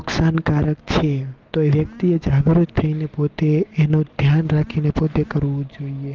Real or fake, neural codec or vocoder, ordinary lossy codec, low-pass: real; none; Opus, 16 kbps; 7.2 kHz